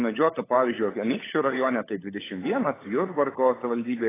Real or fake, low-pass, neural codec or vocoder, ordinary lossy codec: fake; 3.6 kHz; codec, 16 kHz, 16 kbps, FunCodec, trained on LibriTTS, 50 frames a second; AAC, 16 kbps